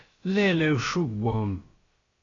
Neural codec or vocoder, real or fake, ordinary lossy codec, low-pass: codec, 16 kHz, about 1 kbps, DyCAST, with the encoder's durations; fake; AAC, 32 kbps; 7.2 kHz